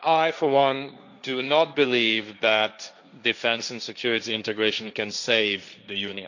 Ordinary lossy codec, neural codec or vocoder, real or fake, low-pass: none; codec, 16 kHz, 1.1 kbps, Voila-Tokenizer; fake; 7.2 kHz